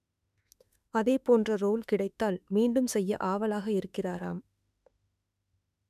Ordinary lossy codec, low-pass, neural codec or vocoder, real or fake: none; 14.4 kHz; autoencoder, 48 kHz, 32 numbers a frame, DAC-VAE, trained on Japanese speech; fake